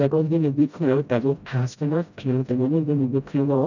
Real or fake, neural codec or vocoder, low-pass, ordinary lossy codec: fake; codec, 16 kHz, 0.5 kbps, FreqCodec, smaller model; 7.2 kHz; none